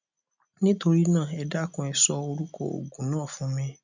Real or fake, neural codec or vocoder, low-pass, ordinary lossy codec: real; none; 7.2 kHz; none